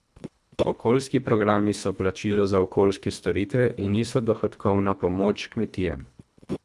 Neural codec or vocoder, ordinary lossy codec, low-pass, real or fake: codec, 24 kHz, 1.5 kbps, HILCodec; none; none; fake